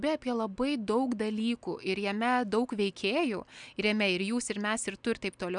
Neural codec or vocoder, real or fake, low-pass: none; real; 9.9 kHz